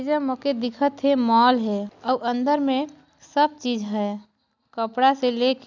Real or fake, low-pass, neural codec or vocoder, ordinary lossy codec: real; 7.2 kHz; none; none